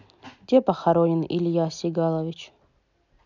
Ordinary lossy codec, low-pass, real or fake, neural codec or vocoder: none; 7.2 kHz; real; none